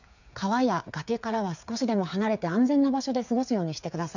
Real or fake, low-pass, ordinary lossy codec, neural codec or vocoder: fake; 7.2 kHz; none; codec, 16 kHz, 8 kbps, FreqCodec, smaller model